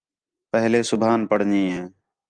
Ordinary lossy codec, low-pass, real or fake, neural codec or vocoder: Opus, 32 kbps; 9.9 kHz; real; none